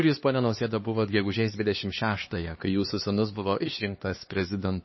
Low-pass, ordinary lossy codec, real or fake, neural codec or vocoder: 7.2 kHz; MP3, 24 kbps; fake; codec, 16 kHz, 2 kbps, X-Codec, HuBERT features, trained on LibriSpeech